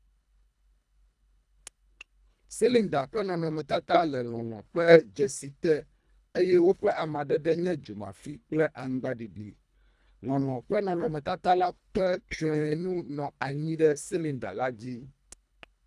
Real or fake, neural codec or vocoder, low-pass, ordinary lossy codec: fake; codec, 24 kHz, 1.5 kbps, HILCodec; none; none